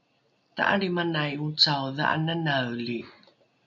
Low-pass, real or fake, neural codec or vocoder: 7.2 kHz; real; none